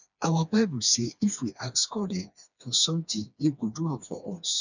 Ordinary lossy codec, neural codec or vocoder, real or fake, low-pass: none; codec, 16 kHz, 2 kbps, FreqCodec, smaller model; fake; 7.2 kHz